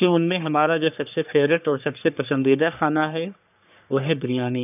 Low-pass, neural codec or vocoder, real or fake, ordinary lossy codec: 3.6 kHz; codec, 44.1 kHz, 3.4 kbps, Pupu-Codec; fake; none